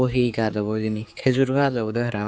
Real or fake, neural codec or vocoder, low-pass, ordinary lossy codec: fake; codec, 16 kHz, 4 kbps, X-Codec, HuBERT features, trained on general audio; none; none